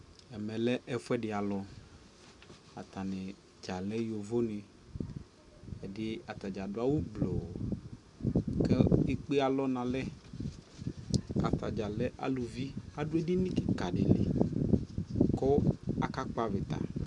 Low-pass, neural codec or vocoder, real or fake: 10.8 kHz; none; real